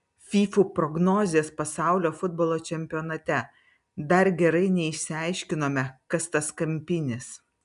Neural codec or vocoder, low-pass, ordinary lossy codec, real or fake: none; 10.8 kHz; MP3, 96 kbps; real